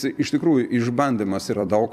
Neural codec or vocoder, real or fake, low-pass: vocoder, 44.1 kHz, 128 mel bands every 256 samples, BigVGAN v2; fake; 14.4 kHz